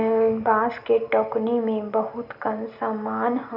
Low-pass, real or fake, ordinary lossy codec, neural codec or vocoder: 5.4 kHz; real; none; none